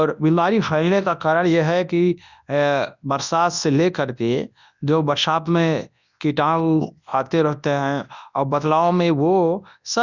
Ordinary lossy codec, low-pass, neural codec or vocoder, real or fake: none; 7.2 kHz; codec, 24 kHz, 0.9 kbps, WavTokenizer, large speech release; fake